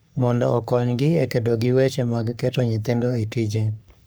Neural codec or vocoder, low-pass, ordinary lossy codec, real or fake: codec, 44.1 kHz, 3.4 kbps, Pupu-Codec; none; none; fake